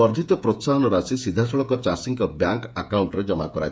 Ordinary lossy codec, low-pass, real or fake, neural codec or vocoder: none; none; fake; codec, 16 kHz, 8 kbps, FreqCodec, smaller model